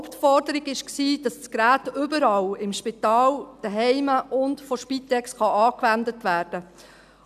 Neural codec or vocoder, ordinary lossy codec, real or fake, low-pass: none; none; real; 14.4 kHz